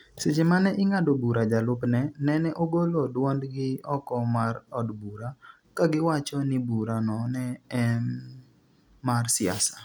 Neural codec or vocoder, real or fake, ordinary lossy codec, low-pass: none; real; none; none